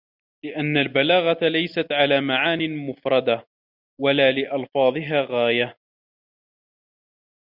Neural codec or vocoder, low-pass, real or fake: none; 5.4 kHz; real